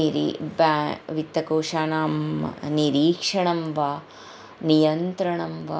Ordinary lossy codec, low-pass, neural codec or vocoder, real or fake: none; none; none; real